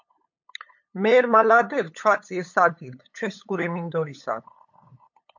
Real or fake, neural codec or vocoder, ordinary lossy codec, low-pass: fake; codec, 16 kHz, 8 kbps, FunCodec, trained on LibriTTS, 25 frames a second; MP3, 48 kbps; 7.2 kHz